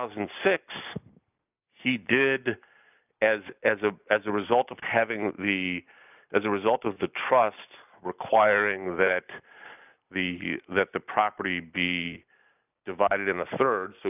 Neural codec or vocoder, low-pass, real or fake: none; 3.6 kHz; real